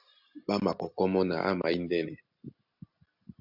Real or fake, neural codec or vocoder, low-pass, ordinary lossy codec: real; none; 5.4 kHz; AAC, 48 kbps